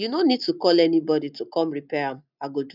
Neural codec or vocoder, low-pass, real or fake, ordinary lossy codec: none; 5.4 kHz; real; none